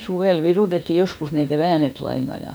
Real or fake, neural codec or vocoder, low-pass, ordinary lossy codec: fake; autoencoder, 48 kHz, 32 numbers a frame, DAC-VAE, trained on Japanese speech; none; none